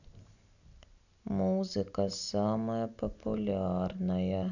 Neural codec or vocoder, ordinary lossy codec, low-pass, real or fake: none; none; 7.2 kHz; real